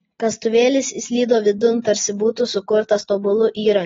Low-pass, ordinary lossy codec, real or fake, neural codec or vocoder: 7.2 kHz; AAC, 24 kbps; real; none